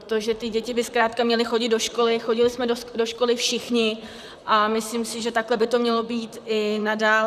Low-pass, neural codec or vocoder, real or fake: 14.4 kHz; vocoder, 44.1 kHz, 128 mel bands, Pupu-Vocoder; fake